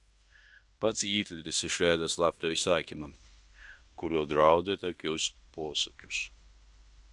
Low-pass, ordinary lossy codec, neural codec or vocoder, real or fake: 10.8 kHz; Opus, 64 kbps; codec, 16 kHz in and 24 kHz out, 0.9 kbps, LongCat-Audio-Codec, fine tuned four codebook decoder; fake